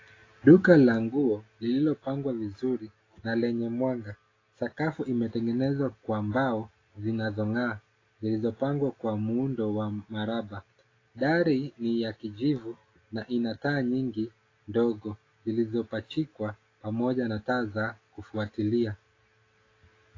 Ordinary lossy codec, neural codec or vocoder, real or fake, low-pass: AAC, 32 kbps; none; real; 7.2 kHz